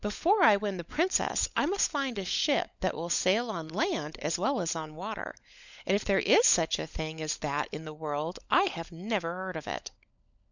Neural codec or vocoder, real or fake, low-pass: codec, 16 kHz, 16 kbps, FunCodec, trained on LibriTTS, 50 frames a second; fake; 7.2 kHz